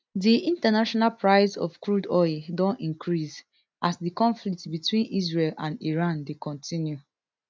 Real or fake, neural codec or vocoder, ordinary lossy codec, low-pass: real; none; none; none